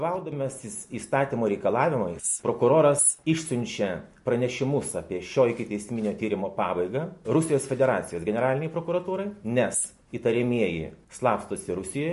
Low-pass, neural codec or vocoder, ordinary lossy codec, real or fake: 14.4 kHz; none; MP3, 48 kbps; real